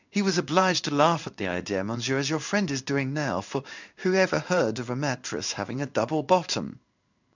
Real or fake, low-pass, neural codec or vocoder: fake; 7.2 kHz; codec, 16 kHz in and 24 kHz out, 1 kbps, XY-Tokenizer